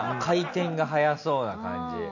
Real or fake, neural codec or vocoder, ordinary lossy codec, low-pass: real; none; none; 7.2 kHz